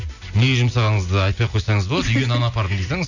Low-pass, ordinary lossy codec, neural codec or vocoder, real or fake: 7.2 kHz; none; none; real